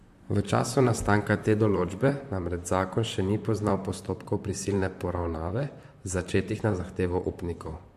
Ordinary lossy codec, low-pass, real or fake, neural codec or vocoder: MP3, 64 kbps; 14.4 kHz; fake; vocoder, 44.1 kHz, 128 mel bands, Pupu-Vocoder